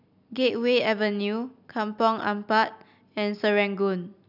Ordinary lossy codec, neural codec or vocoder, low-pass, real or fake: none; none; 5.4 kHz; real